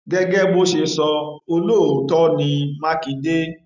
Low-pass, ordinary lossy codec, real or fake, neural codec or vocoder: 7.2 kHz; none; real; none